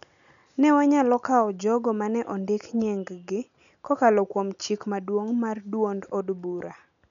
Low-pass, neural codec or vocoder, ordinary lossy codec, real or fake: 7.2 kHz; none; none; real